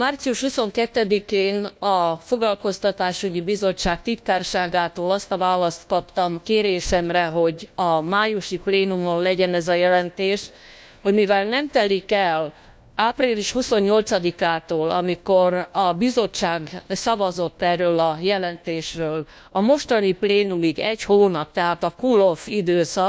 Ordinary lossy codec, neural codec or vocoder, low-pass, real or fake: none; codec, 16 kHz, 1 kbps, FunCodec, trained on LibriTTS, 50 frames a second; none; fake